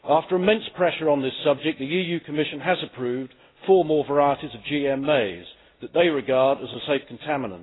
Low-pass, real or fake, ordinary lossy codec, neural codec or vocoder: 7.2 kHz; real; AAC, 16 kbps; none